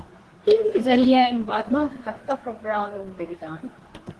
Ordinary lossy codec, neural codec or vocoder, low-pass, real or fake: Opus, 16 kbps; codec, 24 kHz, 1 kbps, SNAC; 10.8 kHz; fake